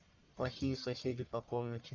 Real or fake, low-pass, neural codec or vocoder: fake; 7.2 kHz; codec, 44.1 kHz, 1.7 kbps, Pupu-Codec